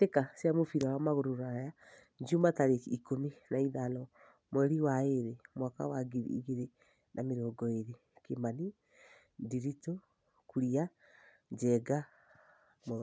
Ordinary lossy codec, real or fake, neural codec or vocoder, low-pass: none; real; none; none